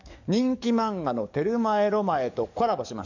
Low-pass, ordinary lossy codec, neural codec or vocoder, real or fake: 7.2 kHz; none; codec, 44.1 kHz, 7.8 kbps, DAC; fake